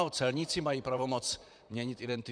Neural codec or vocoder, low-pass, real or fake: vocoder, 44.1 kHz, 128 mel bands, Pupu-Vocoder; 9.9 kHz; fake